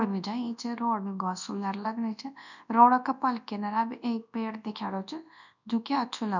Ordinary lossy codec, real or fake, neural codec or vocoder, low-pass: none; fake; codec, 24 kHz, 0.9 kbps, WavTokenizer, large speech release; 7.2 kHz